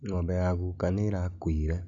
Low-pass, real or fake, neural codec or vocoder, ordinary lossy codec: 7.2 kHz; real; none; none